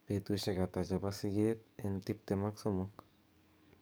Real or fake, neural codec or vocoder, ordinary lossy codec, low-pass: fake; codec, 44.1 kHz, 7.8 kbps, DAC; none; none